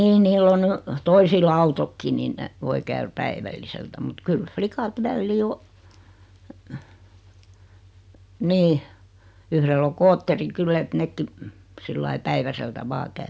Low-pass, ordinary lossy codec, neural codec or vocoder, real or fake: none; none; none; real